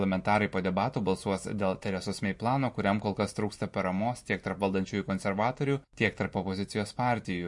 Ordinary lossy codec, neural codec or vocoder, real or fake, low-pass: MP3, 48 kbps; none; real; 10.8 kHz